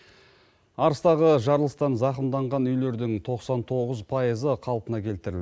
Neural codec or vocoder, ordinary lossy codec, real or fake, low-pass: none; none; real; none